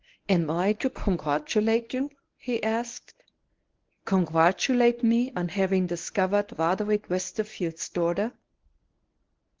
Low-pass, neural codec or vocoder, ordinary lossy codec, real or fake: 7.2 kHz; codec, 24 kHz, 0.9 kbps, WavTokenizer, medium speech release version 1; Opus, 16 kbps; fake